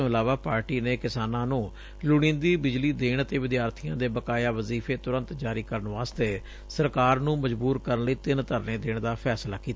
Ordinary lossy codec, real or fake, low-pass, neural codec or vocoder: none; real; none; none